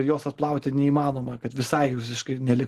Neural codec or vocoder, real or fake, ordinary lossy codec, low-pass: none; real; Opus, 16 kbps; 14.4 kHz